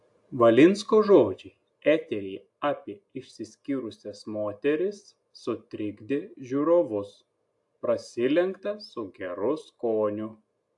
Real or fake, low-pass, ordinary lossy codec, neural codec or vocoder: real; 10.8 kHz; AAC, 64 kbps; none